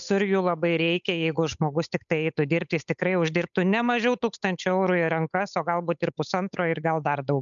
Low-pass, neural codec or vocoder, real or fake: 7.2 kHz; codec, 16 kHz, 8 kbps, FunCodec, trained on Chinese and English, 25 frames a second; fake